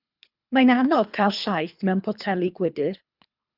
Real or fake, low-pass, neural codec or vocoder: fake; 5.4 kHz; codec, 24 kHz, 3 kbps, HILCodec